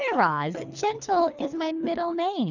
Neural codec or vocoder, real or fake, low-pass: codec, 24 kHz, 3 kbps, HILCodec; fake; 7.2 kHz